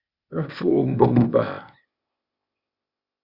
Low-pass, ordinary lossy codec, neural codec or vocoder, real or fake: 5.4 kHz; Opus, 64 kbps; codec, 16 kHz, 0.8 kbps, ZipCodec; fake